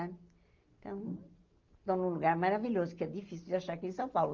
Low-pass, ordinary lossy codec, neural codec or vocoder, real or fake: 7.2 kHz; Opus, 24 kbps; none; real